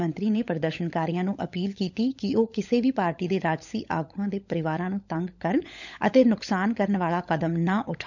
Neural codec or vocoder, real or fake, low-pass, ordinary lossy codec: codec, 16 kHz, 16 kbps, FunCodec, trained on LibriTTS, 50 frames a second; fake; 7.2 kHz; none